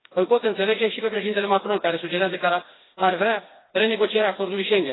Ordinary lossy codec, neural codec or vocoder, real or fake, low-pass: AAC, 16 kbps; codec, 16 kHz, 2 kbps, FreqCodec, smaller model; fake; 7.2 kHz